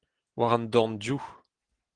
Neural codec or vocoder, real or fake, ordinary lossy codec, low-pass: none; real; Opus, 16 kbps; 9.9 kHz